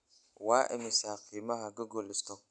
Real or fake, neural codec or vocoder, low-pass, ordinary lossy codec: real; none; 9.9 kHz; none